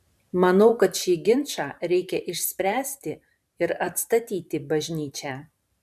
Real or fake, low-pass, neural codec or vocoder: fake; 14.4 kHz; vocoder, 44.1 kHz, 128 mel bands every 512 samples, BigVGAN v2